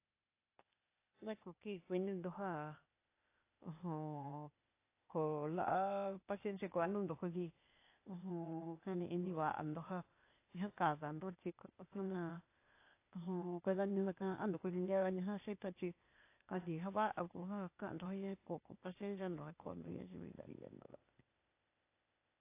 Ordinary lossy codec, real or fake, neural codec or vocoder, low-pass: AAC, 24 kbps; fake; codec, 16 kHz, 0.8 kbps, ZipCodec; 3.6 kHz